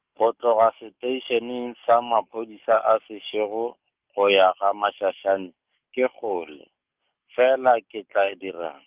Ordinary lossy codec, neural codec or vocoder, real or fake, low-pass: Opus, 32 kbps; codec, 44.1 kHz, 7.8 kbps, DAC; fake; 3.6 kHz